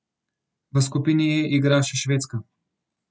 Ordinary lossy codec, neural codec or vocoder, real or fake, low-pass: none; none; real; none